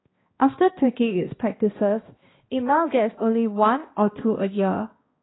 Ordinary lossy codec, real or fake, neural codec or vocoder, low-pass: AAC, 16 kbps; fake; codec, 16 kHz, 1 kbps, X-Codec, HuBERT features, trained on balanced general audio; 7.2 kHz